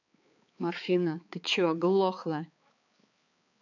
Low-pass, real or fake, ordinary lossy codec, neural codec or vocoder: 7.2 kHz; fake; none; codec, 16 kHz, 4 kbps, X-Codec, HuBERT features, trained on balanced general audio